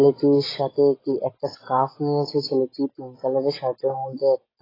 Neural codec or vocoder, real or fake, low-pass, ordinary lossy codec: none; real; 5.4 kHz; AAC, 24 kbps